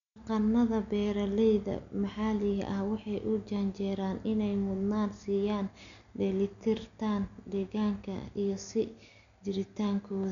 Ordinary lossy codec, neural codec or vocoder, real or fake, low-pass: none; none; real; 7.2 kHz